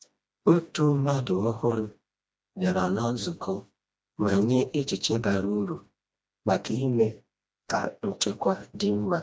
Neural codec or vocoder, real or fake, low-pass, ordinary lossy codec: codec, 16 kHz, 1 kbps, FreqCodec, smaller model; fake; none; none